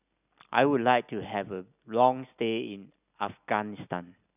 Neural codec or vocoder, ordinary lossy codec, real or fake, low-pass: none; none; real; 3.6 kHz